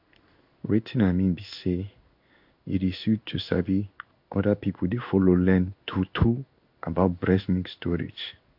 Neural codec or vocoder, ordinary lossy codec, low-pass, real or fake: codec, 16 kHz in and 24 kHz out, 1 kbps, XY-Tokenizer; MP3, 48 kbps; 5.4 kHz; fake